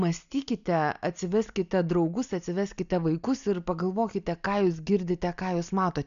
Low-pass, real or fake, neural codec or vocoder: 7.2 kHz; real; none